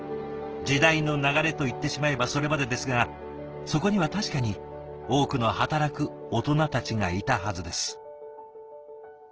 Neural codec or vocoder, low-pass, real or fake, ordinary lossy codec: none; 7.2 kHz; real; Opus, 16 kbps